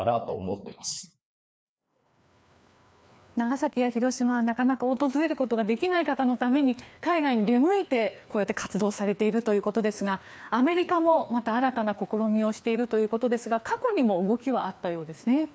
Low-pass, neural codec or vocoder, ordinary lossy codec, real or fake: none; codec, 16 kHz, 2 kbps, FreqCodec, larger model; none; fake